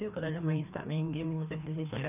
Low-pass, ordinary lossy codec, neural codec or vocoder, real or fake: 3.6 kHz; none; codec, 16 kHz, 2 kbps, FreqCodec, larger model; fake